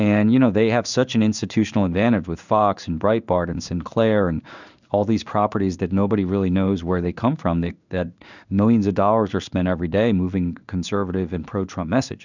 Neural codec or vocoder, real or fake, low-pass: codec, 16 kHz in and 24 kHz out, 1 kbps, XY-Tokenizer; fake; 7.2 kHz